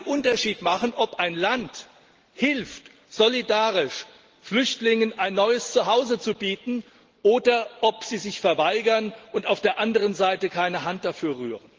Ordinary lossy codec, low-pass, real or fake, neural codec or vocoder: Opus, 16 kbps; 7.2 kHz; real; none